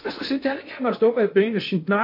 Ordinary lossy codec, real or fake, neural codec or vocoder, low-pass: MP3, 32 kbps; fake; codec, 16 kHz in and 24 kHz out, 0.8 kbps, FocalCodec, streaming, 65536 codes; 5.4 kHz